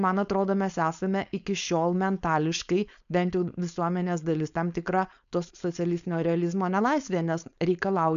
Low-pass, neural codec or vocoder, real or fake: 7.2 kHz; codec, 16 kHz, 4.8 kbps, FACodec; fake